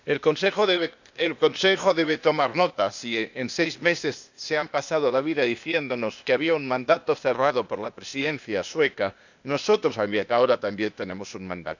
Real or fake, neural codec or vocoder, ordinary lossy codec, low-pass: fake; codec, 16 kHz, 0.8 kbps, ZipCodec; none; 7.2 kHz